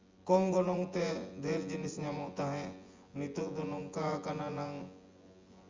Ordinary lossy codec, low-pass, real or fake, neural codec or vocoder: Opus, 32 kbps; 7.2 kHz; fake; vocoder, 24 kHz, 100 mel bands, Vocos